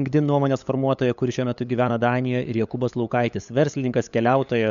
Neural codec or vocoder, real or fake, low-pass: codec, 16 kHz, 8 kbps, FunCodec, trained on LibriTTS, 25 frames a second; fake; 7.2 kHz